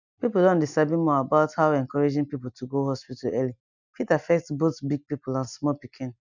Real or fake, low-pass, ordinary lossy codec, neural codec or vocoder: real; 7.2 kHz; none; none